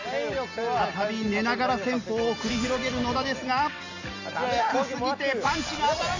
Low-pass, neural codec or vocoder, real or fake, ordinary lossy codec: 7.2 kHz; none; real; AAC, 48 kbps